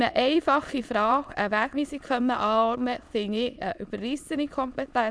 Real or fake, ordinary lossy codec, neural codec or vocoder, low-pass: fake; none; autoencoder, 22.05 kHz, a latent of 192 numbers a frame, VITS, trained on many speakers; none